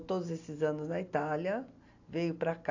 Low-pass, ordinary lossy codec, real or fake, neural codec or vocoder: 7.2 kHz; AAC, 48 kbps; real; none